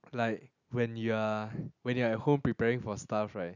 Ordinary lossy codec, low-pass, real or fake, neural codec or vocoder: none; 7.2 kHz; real; none